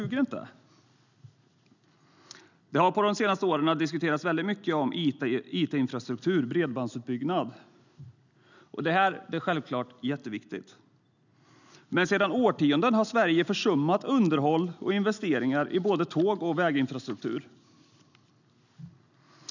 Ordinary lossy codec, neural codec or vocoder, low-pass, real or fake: none; none; 7.2 kHz; real